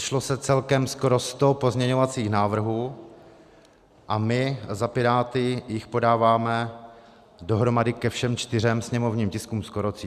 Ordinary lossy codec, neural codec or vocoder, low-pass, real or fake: Opus, 64 kbps; none; 14.4 kHz; real